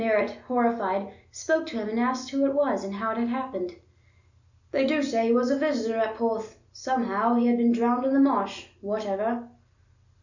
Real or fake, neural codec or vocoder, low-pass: real; none; 7.2 kHz